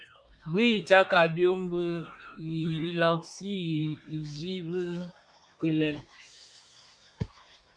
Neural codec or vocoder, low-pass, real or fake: codec, 24 kHz, 1 kbps, SNAC; 9.9 kHz; fake